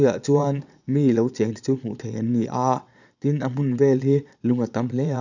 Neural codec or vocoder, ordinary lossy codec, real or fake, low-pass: vocoder, 22.05 kHz, 80 mel bands, Vocos; none; fake; 7.2 kHz